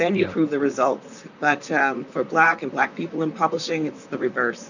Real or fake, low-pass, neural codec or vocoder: fake; 7.2 kHz; vocoder, 44.1 kHz, 128 mel bands, Pupu-Vocoder